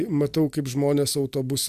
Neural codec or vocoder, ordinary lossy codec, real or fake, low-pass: none; Opus, 64 kbps; real; 14.4 kHz